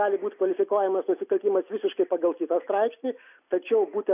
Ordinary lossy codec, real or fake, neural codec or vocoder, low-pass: AAC, 32 kbps; real; none; 3.6 kHz